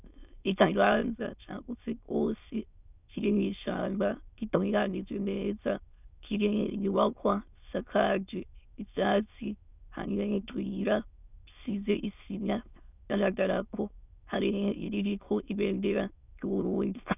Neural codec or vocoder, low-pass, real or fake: autoencoder, 22.05 kHz, a latent of 192 numbers a frame, VITS, trained on many speakers; 3.6 kHz; fake